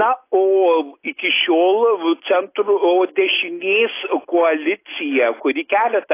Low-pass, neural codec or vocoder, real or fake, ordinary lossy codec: 3.6 kHz; none; real; AAC, 24 kbps